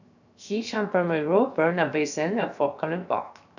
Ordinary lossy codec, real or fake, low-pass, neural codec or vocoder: none; fake; 7.2 kHz; codec, 16 kHz, 0.7 kbps, FocalCodec